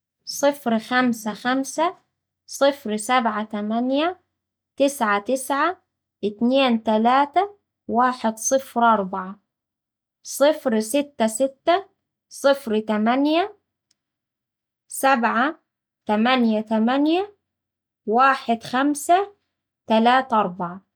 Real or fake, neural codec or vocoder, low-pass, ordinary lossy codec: real; none; none; none